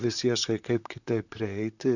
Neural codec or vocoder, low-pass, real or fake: vocoder, 44.1 kHz, 128 mel bands, Pupu-Vocoder; 7.2 kHz; fake